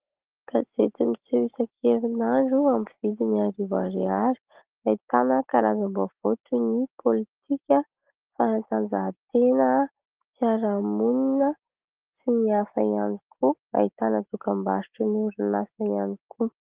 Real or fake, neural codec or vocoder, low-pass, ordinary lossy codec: real; none; 3.6 kHz; Opus, 16 kbps